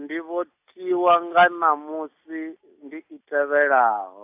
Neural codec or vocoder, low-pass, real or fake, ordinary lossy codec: none; 3.6 kHz; real; AAC, 32 kbps